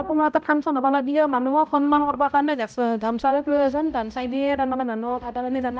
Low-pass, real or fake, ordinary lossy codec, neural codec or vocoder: none; fake; none; codec, 16 kHz, 0.5 kbps, X-Codec, HuBERT features, trained on balanced general audio